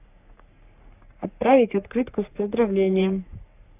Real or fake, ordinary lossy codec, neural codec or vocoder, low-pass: fake; none; codec, 44.1 kHz, 3.4 kbps, Pupu-Codec; 3.6 kHz